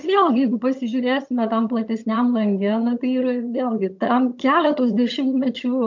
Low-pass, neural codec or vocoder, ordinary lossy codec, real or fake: 7.2 kHz; vocoder, 22.05 kHz, 80 mel bands, HiFi-GAN; MP3, 48 kbps; fake